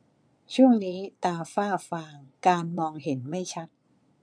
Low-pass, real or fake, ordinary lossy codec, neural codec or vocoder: 9.9 kHz; fake; none; vocoder, 22.05 kHz, 80 mel bands, Vocos